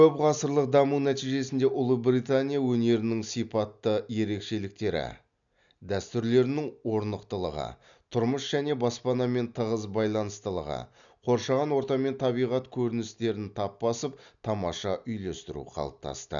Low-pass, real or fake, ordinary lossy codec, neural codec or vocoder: 7.2 kHz; real; none; none